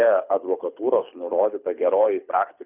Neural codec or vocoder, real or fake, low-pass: codec, 24 kHz, 6 kbps, HILCodec; fake; 3.6 kHz